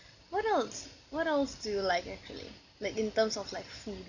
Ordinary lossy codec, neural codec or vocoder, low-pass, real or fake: none; none; 7.2 kHz; real